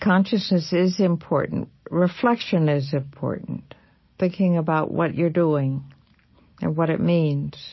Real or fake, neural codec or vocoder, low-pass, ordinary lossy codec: real; none; 7.2 kHz; MP3, 24 kbps